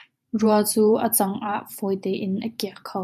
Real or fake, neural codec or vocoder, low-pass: fake; vocoder, 44.1 kHz, 128 mel bands every 512 samples, BigVGAN v2; 14.4 kHz